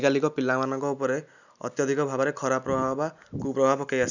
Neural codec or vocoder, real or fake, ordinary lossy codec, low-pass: none; real; none; 7.2 kHz